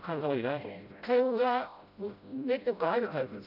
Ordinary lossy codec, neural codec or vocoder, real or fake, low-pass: none; codec, 16 kHz, 0.5 kbps, FreqCodec, smaller model; fake; 5.4 kHz